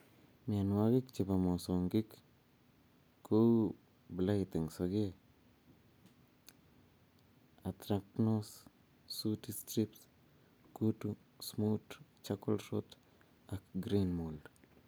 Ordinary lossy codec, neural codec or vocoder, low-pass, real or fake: none; none; none; real